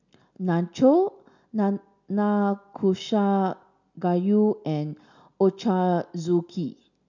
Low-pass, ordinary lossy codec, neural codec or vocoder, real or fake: 7.2 kHz; none; none; real